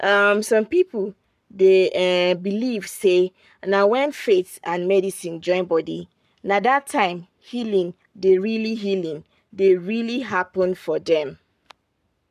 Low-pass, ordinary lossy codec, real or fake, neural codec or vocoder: 14.4 kHz; none; fake; codec, 44.1 kHz, 7.8 kbps, Pupu-Codec